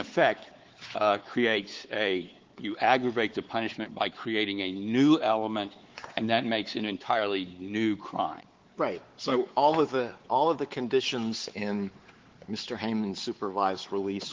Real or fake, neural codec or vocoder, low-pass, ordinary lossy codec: fake; codec, 16 kHz, 4 kbps, X-Codec, WavLM features, trained on Multilingual LibriSpeech; 7.2 kHz; Opus, 16 kbps